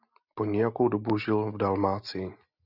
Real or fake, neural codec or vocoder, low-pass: real; none; 5.4 kHz